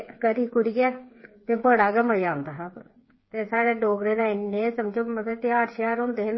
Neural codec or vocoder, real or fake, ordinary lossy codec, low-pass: codec, 16 kHz, 8 kbps, FreqCodec, smaller model; fake; MP3, 24 kbps; 7.2 kHz